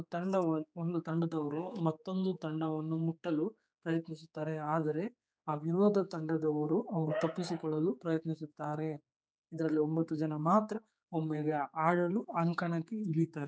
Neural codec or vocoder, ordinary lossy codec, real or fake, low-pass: codec, 16 kHz, 4 kbps, X-Codec, HuBERT features, trained on general audio; none; fake; none